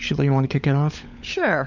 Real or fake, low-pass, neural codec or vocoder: fake; 7.2 kHz; codec, 16 kHz, 16 kbps, FunCodec, trained on LibriTTS, 50 frames a second